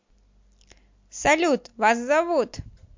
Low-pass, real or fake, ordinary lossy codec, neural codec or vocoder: 7.2 kHz; real; MP3, 48 kbps; none